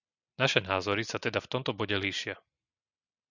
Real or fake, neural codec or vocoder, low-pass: real; none; 7.2 kHz